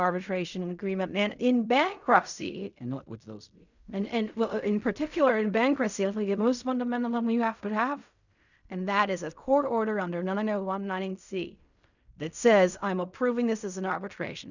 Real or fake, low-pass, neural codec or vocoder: fake; 7.2 kHz; codec, 16 kHz in and 24 kHz out, 0.4 kbps, LongCat-Audio-Codec, fine tuned four codebook decoder